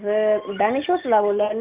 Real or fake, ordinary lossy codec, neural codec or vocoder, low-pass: real; Opus, 64 kbps; none; 3.6 kHz